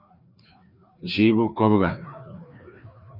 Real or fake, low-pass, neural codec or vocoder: fake; 5.4 kHz; codec, 16 kHz, 2 kbps, FreqCodec, larger model